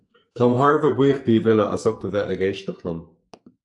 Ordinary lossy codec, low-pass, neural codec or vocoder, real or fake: AAC, 64 kbps; 10.8 kHz; codec, 44.1 kHz, 2.6 kbps, SNAC; fake